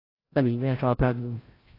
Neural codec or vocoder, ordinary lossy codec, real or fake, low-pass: codec, 16 kHz, 0.5 kbps, FreqCodec, larger model; AAC, 24 kbps; fake; 5.4 kHz